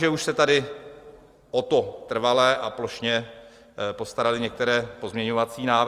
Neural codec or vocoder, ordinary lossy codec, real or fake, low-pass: none; Opus, 32 kbps; real; 14.4 kHz